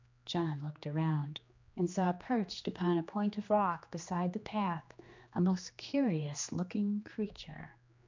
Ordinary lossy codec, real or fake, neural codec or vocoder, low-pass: MP3, 64 kbps; fake; codec, 16 kHz, 2 kbps, X-Codec, HuBERT features, trained on general audio; 7.2 kHz